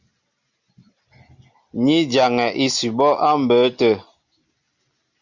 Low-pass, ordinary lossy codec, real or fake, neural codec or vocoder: 7.2 kHz; Opus, 64 kbps; real; none